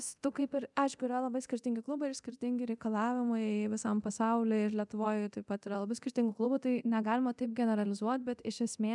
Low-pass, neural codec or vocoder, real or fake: 10.8 kHz; codec, 24 kHz, 0.9 kbps, DualCodec; fake